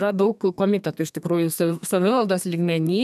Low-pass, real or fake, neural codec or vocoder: 14.4 kHz; fake; codec, 32 kHz, 1.9 kbps, SNAC